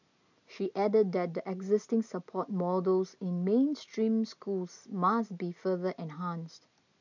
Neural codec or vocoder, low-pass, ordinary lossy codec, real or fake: none; 7.2 kHz; none; real